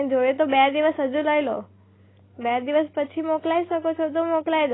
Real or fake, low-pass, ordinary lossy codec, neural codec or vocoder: real; 7.2 kHz; AAC, 16 kbps; none